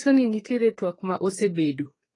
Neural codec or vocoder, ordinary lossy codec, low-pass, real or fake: codec, 32 kHz, 1.9 kbps, SNAC; AAC, 32 kbps; 10.8 kHz; fake